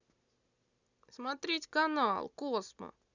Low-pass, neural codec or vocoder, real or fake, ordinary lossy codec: 7.2 kHz; none; real; none